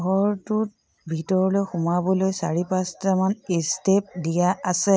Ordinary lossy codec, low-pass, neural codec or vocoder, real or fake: none; none; none; real